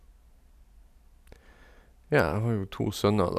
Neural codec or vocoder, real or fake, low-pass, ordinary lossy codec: none; real; 14.4 kHz; none